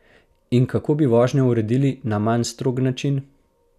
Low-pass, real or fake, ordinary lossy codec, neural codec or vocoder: 14.4 kHz; real; none; none